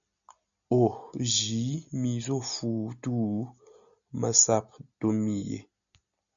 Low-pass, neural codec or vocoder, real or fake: 7.2 kHz; none; real